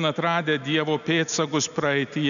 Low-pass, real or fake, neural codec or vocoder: 7.2 kHz; real; none